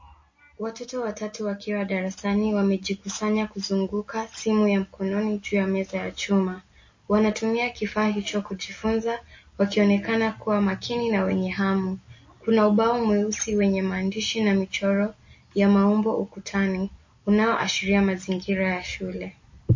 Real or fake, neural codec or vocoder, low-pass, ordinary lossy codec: real; none; 7.2 kHz; MP3, 32 kbps